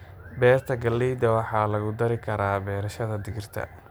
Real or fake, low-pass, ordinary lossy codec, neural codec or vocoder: real; none; none; none